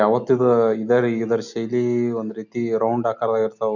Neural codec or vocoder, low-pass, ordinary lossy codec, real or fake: none; 7.2 kHz; none; real